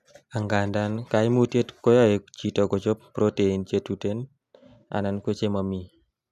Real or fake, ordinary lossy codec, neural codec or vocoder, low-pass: real; none; none; 14.4 kHz